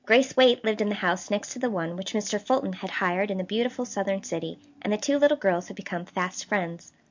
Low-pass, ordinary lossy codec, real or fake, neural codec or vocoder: 7.2 kHz; MP3, 48 kbps; real; none